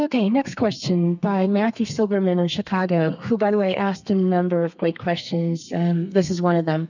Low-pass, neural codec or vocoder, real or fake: 7.2 kHz; codec, 32 kHz, 1.9 kbps, SNAC; fake